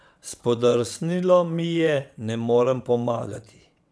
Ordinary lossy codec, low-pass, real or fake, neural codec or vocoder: none; none; fake; vocoder, 22.05 kHz, 80 mel bands, WaveNeXt